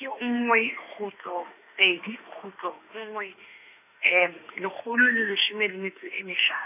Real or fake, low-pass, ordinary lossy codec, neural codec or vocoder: fake; 3.6 kHz; none; autoencoder, 48 kHz, 32 numbers a frame, DAC-VAE, trained on Japanese speech